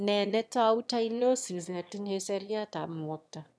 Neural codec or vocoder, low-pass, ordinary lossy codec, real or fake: autoencoder, 22.05 kHz, a latent of 192 numbers a frame, VITS, trained on one speaker; none; none; fake